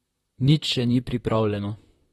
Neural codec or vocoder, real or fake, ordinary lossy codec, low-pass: vocoder, 44.1 kHz, 128 mel bands, Pupu-Vocoder; fake; AAC, 32 kbps; 19.8 kHz